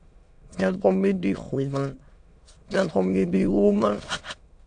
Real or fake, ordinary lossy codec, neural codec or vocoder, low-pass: fake; AAC, 64 kbps; autoencoder, 22.05 kHz, a latent of 192 numbers a frame, VITS, trained on many speakers; 9.9 kHz